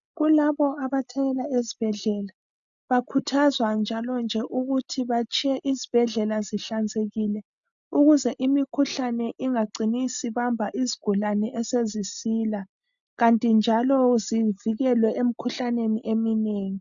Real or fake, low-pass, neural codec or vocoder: real; 7.2 kHz; none